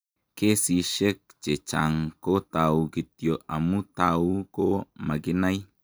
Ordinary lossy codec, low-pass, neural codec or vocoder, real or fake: none; none; none; real